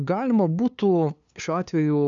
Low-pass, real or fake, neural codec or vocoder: 7.2 kHz; fake; codec, 16 kHz, 2 kbps, FunCodec, trained on LibriTTS, 25 frames a second